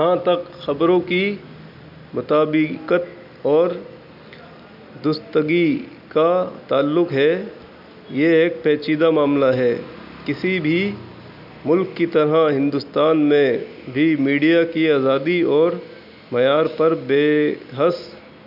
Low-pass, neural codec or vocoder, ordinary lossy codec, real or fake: 5.4 kHz; none; none; real